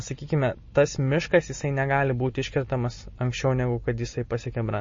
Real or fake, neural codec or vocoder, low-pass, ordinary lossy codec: real; none; 7.2 kHz; MP3, 32 kbps